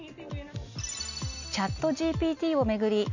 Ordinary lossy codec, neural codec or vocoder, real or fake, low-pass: none; none; real; 7.2 kHz